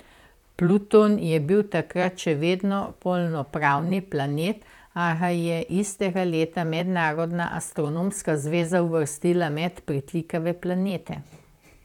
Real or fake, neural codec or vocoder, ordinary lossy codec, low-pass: fake; vocoder, 44.1 kHz, 128 mel bands, Pupu-Vocoder; none; 19.8 kHz